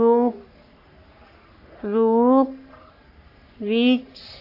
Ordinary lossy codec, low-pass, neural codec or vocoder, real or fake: AAC, 32 kbps; 5.4 kHz; codec, 44.1 kHz, 3.4 kbps, Pupu-Codec; fake